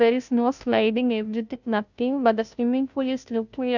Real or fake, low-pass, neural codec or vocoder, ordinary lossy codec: fake; 7.2 kHz; codec, 16 kHz, 0.5 kbps, FunCodec, trained on Chinese and English, 25 frames a second; none